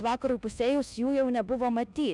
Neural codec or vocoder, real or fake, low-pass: codec, 24 kHz, 1.2 kbps, DualCodec; fake; 10.8 kHz